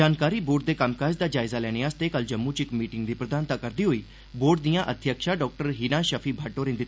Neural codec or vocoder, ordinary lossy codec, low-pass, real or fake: none; none; none; real